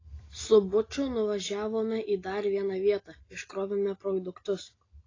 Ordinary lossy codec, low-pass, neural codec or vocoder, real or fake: AAC, 32 kbps; 7.2 kHz; none; real